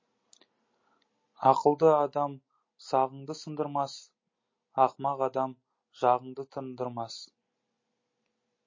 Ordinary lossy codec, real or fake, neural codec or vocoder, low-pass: MP3, 32 kbps; real; none; 7.2 kHz